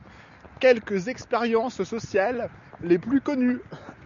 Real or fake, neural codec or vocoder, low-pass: real; none; 7.2 kHz